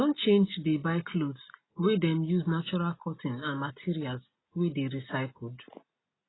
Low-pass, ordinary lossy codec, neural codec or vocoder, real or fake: 7.2 kHz; AAC, 16 kbps; none; real